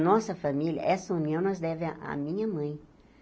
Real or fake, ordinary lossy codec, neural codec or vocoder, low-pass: real; none; none; none